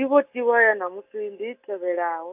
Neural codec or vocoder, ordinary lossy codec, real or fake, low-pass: none; AAC, 32 kbps; real; 3.6 kHz